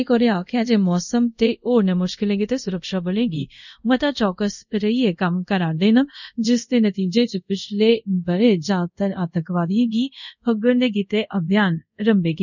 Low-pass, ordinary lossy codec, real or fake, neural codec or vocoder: 7.2 kHz; none; fake; codec, 24 kHz, 0.5 kbps, DualCodec